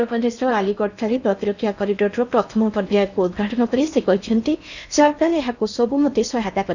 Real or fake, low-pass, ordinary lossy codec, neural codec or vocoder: fake; 7.2 kHz; none; codec, 16 kHz in and 24 kHz out, 0.8 kbps, FocalCodec, streaming, 65536 codes